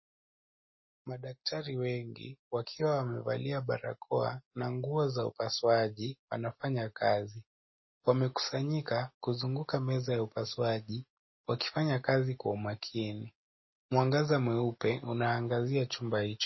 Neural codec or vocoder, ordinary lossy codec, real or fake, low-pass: none; MP3, 24 kbps; real; 7.2 kHz